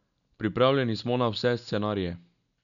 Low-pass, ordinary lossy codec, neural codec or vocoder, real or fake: 7.2 kHz; none; none; real